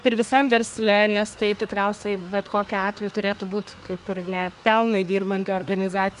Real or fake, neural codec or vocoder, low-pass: fake; codec, 24 kHz, 1 kbps, SNAC; 10.8 kHz